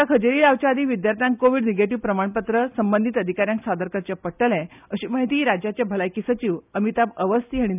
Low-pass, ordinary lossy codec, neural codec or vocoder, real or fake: 3.6 kHz; none; none; real